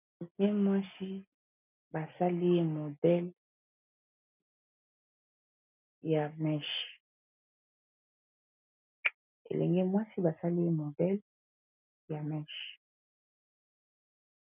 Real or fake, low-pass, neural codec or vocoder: real; 3.6 kHz; none